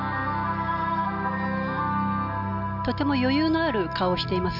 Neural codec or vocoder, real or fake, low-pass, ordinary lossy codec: none; real; 5.4 kHz; none